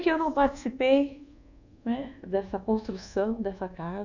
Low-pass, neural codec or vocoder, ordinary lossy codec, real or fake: 7.2 kHz; codec, 24 kHz, 1.2 kbps, DualCodec; none; fake